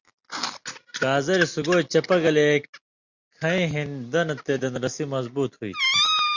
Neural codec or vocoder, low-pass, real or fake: none; 7.2 kHz; real